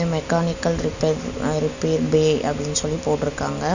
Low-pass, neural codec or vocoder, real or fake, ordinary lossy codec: 7.2 kHz; none; real; none